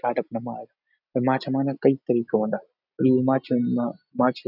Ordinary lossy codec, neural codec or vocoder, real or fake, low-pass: none; none; real; 5.4 kHz